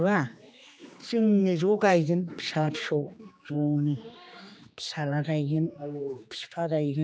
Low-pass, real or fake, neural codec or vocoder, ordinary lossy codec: none; fake; codec, 16 kHz, 2 kbps, X-Codec, HuBERT features, trained on general audio; none